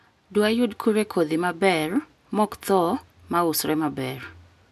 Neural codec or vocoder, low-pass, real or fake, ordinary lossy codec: none; 14.4 kHz; real; none